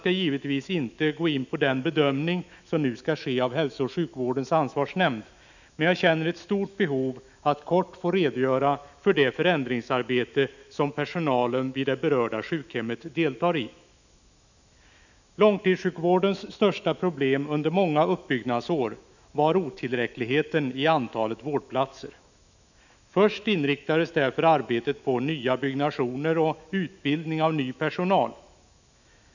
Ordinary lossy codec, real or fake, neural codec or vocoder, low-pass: none; fake; autoencoder, 48 kHz, 128 numbers a frame, DAC-VAE, trained on Japanese speech; 7.2 kHz